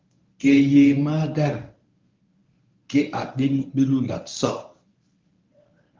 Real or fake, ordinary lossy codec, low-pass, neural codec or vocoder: fake; Opus, 24 kbps; 7.2 kHz; codec, 24 kHz, 0.9 kbps, WavTokenizer, medium speech release version 1